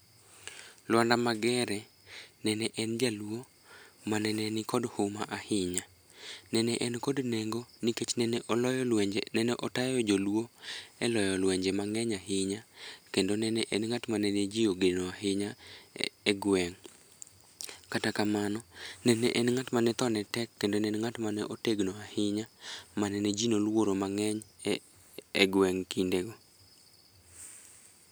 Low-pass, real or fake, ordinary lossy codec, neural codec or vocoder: none; real; none; none